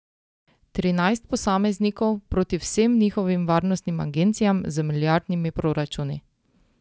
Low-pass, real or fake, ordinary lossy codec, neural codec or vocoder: none; real; none; none